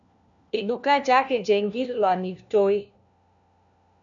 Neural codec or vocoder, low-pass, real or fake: codec, 16 kHz, 1 kbps, FunCodec, trained on LibriTTS, 50 frames a second; 7.2 kHz; fake